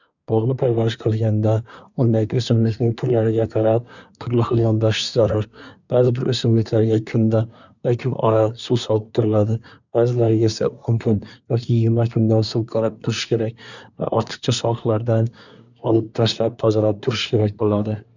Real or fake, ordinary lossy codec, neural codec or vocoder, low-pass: fake; none; codec, 24 kHz, 1 kbps, SNAC; 7.2 kHz